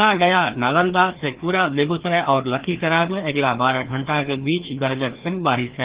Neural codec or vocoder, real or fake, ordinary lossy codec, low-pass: codec, 16 kHz, 2 kbps, FreqCodec, larger model; fake; Opus, 16 kbps; 3.6 kHz